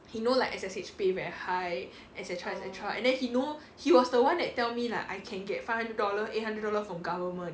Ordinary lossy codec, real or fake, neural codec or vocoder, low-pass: none; real; none; none